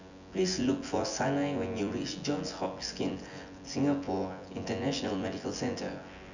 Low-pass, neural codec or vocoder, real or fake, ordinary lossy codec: 7.2 kHz; vocoder, 24 kHz, 100 mel bands, Vocos; fake; none